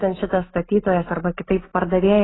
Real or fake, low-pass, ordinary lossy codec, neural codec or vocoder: real; 7.2 kHz; AAC, 16 kbps; none